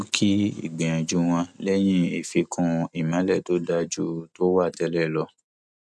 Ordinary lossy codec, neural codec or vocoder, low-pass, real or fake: none; none; none; real